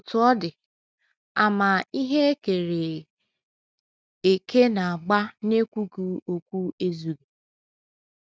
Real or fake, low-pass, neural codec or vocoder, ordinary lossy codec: real; none; none; none